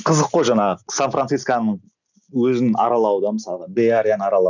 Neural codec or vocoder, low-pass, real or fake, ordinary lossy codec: none; 7.2 kHz; real; none